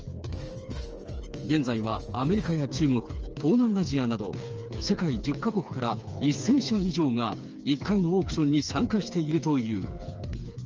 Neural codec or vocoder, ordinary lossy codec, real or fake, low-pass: codec, 16 kHz, 4 kbps, FreqCodec, smaller model; Opus, 24 kbps; fake; 7.2 kHz